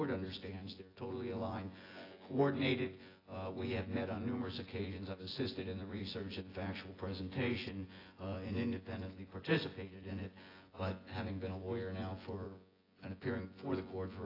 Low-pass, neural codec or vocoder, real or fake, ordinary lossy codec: 5.4 kHz; vocoder, 24 kHz, 100 mel bands, Vocos; fake; AAC, 24 kbps